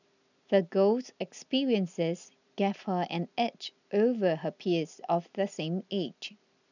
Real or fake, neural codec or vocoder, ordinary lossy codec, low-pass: real; none; none; 7.2 kHz